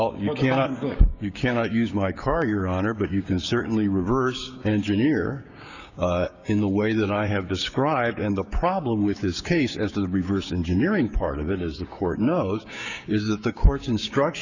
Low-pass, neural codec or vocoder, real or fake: 7.2 kHz; codec, 16 kHz, 6 kbps, DAC; fake